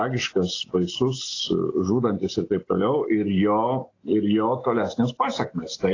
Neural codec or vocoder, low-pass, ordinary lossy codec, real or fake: none; 7.2 kHz; AAC, 32 kbps; real